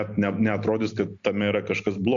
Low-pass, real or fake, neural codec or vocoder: 7.2 kHz; real; none